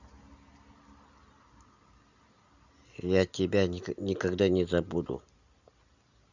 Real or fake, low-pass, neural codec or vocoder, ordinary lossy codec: real; 7.2 kHz; none; Opus, 64 kbps